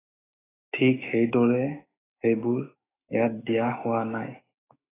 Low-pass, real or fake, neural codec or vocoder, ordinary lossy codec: 3.6 kHz; fake; autoencoder, 48 kHz, 128 numbers a frame, DAC-VAE, trained on Japanese speech; AAC, 16 kbps